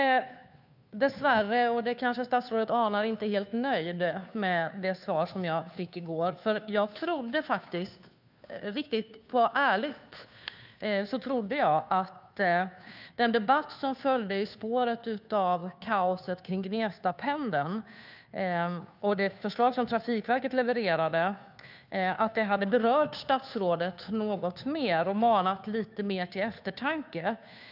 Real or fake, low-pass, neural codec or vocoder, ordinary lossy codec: fake; 5.4 kHz; codec, 16 kHz, 2 kbps, FunCodec, trained on Chinese and English, 25 frames a second; none